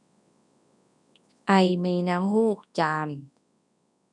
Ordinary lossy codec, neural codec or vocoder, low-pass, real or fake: none; codec, 24 kHz, 0.9 kbps, WavTokenizer, large speech release; 10.8 kHz; fake